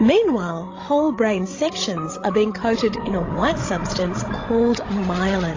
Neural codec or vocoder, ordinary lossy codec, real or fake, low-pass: codec, 16 kHz, 16 kbps, FreqCodec, larger model; AAC, 32 kbps; fake; 7.2 kHz